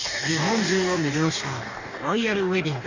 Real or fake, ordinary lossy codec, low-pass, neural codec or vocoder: fake; none; 7.2 kHz; codec, 44.1 kHz, 2.6 kbps, DAC